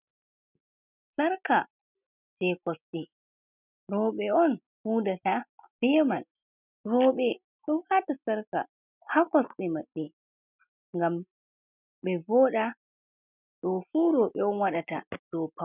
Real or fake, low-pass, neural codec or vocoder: real; 3.6 kHz; none